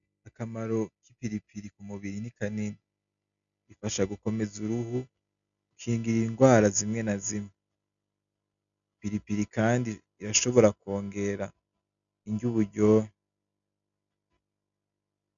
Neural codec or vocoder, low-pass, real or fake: none; 7.2 kHz; real